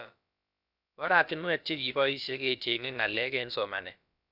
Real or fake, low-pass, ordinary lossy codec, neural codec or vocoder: fake; 5.4 kHz; none; codec, 16 kHz, about 1 kbps, DyCAST, with the encoder's durations